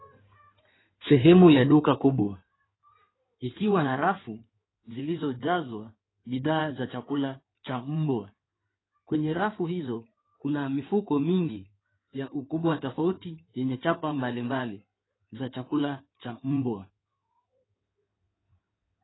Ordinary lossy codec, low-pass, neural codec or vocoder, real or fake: AAC, 16 kbps; 7.2 kHz; codec, 16 kHz in and 24 kHz out, 2.2 kbps, FireRedTTS-2 codec; fake